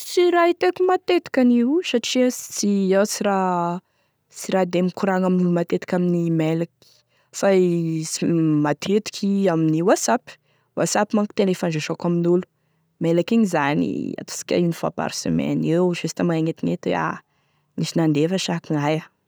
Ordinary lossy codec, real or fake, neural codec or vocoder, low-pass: none; real; none; none